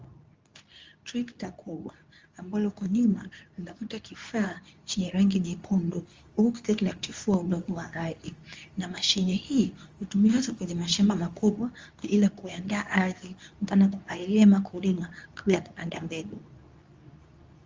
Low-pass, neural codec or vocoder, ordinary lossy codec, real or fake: 7.2 kHz; codec, 24 kHz, 0.9 kbps, WavTokenizer, medium speech release version 2; Opus, 32 kbps; fake